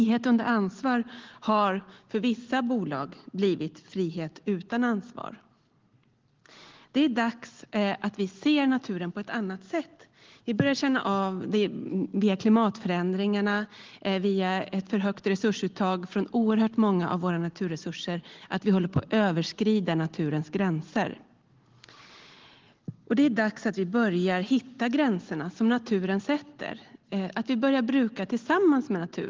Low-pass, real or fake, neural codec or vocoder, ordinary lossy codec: 7.2 kHz; real; none; Opus, 16 kbps